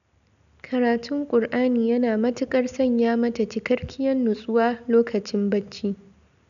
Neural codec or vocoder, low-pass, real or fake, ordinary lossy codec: none; 7.2 kHz; real; none